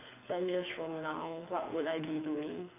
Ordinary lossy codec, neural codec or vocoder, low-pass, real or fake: AAC, 24 kbps; codec, 24 kHz, 6 kbps, HILCodec; 3.6 kHz; fake